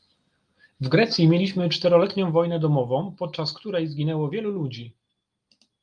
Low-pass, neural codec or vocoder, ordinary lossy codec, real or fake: 9.9 kHz; none; Opus, 32 kbps; real